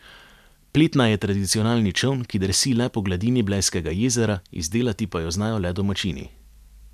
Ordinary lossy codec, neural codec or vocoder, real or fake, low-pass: none; none; real; 14.4 kHz